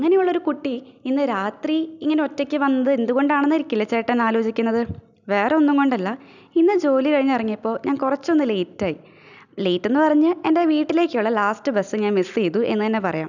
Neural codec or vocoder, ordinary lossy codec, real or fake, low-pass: none; none; real; 7.2 kHz